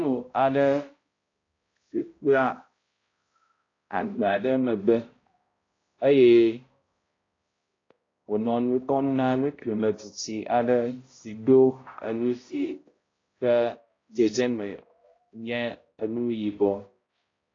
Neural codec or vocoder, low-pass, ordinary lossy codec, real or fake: codec, 16 kHz, 0.5 kbps, X-Codec, HuBERT features, trained on balanced general audio; 7.2 kHz; AAC, 32 kbps; fake